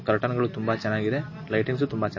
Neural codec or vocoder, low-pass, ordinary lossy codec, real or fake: none; 7.2 kHz; MP3, 32 kbps; real